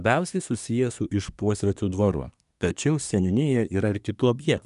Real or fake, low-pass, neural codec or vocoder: fake; 10.8 kHz; codec, 24 kHz, 1 kbps, SNAC